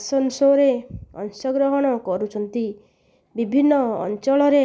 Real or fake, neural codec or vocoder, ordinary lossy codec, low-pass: real; none; none; none